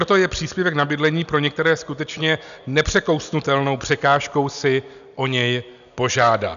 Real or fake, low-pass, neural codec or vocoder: real; 7.2 kHz; none